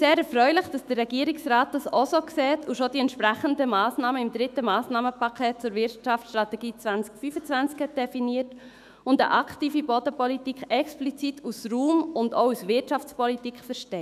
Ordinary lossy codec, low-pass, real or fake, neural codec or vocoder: none; 14.4 kHz; fake; autoencoder, 48 kHz, 128 numbers a frame, DAC-VAE, trained on Japanese speech